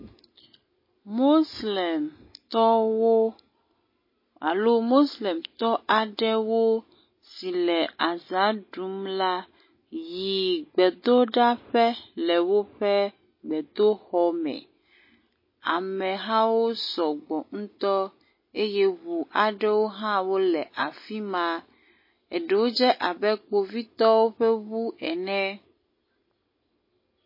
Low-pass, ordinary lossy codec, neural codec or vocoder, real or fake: 5.4 kHz; MP3, 24 kbps; none; real